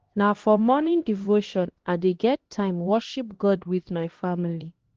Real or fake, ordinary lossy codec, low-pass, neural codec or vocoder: fake; Opus, 16 kbps; 7.2 kHz; codec, 16 kHz, 1 kbps, X-Codec, HuBERT features, trained on LibriSpeech